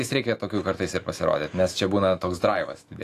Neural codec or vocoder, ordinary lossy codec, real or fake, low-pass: autoencoder, 48 kHz, 128 numbers a frame, DAC-VAE, trained on Japanese speech; AAC, 48 kbps; fake; 14.4 kHz